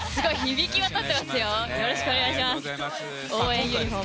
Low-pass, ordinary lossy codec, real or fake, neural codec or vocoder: none; none; real; none